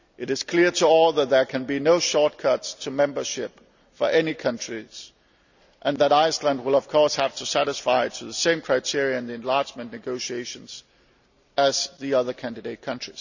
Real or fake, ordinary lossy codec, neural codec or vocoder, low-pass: real; none; none; 7.2 kHz